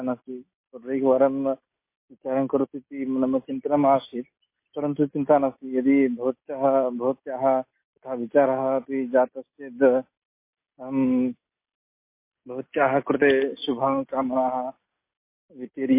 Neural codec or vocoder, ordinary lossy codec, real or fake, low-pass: none; MP3, 24 kbps; real; 3.6 kHz